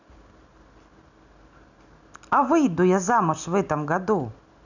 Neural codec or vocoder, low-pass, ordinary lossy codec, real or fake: none; 7.2 kHz; none; real